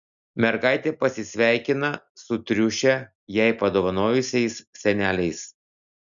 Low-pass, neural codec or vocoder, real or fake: 7.2 kHz; none; real